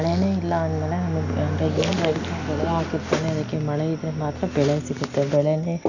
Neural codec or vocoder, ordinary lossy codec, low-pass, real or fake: none; none; 7.2 kHz; real